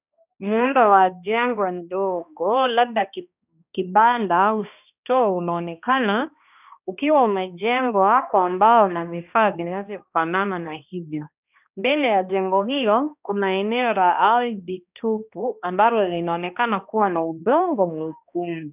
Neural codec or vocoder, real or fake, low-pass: codec, 16 kHz, 1 kbps, X-Codec, HuBERT features, trained on balanced general audio; fake; 3.6 kHz